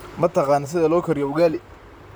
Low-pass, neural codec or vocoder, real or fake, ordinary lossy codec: none; vocoder, 44.1 kHz, 128 mel bands, Pupu-Vocoder; fake; none